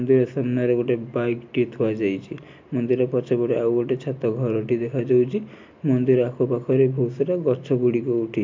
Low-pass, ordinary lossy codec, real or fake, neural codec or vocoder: 7.2 kHz; MP3, 48 kbps; real; none